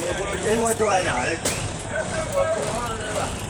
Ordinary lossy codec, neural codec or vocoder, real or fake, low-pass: none; vocoder, 44.1 kHz, 128 mel bands, Pupu-Vocoder; fake; none